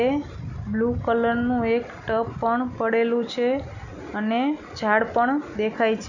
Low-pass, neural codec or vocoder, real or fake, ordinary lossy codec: 7.2 kHz; none; real; none